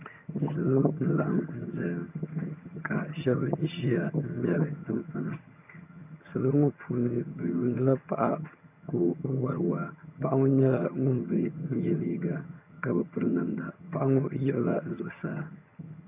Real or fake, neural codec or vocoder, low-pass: fake; vocoder, 22.05 kHz, 80 mel bands, HiFi-GAN; 3.6 kHz